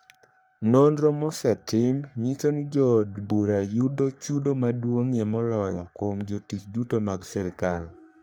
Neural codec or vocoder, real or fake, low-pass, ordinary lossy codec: codec, 44.1 kHz, 3.4 kbps, Pupu-Codec; fake; none; none